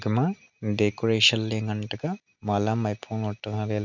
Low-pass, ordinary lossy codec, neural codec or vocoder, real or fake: 7.2 kHz; none; none; real